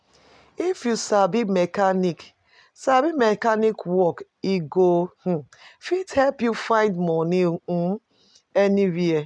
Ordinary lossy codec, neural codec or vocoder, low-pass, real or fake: none; none; 9.9 kHz; real